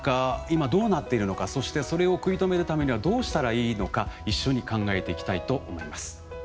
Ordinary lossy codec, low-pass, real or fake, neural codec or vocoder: none; none; real; none